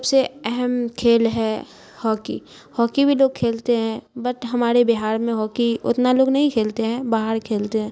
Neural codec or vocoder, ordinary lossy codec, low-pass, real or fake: none; none; none; real